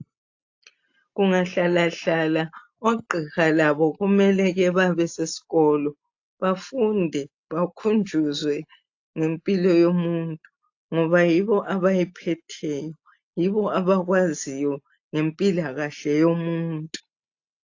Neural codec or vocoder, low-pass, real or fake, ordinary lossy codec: none; 7.2 kHz; real; AAC, 48 kbps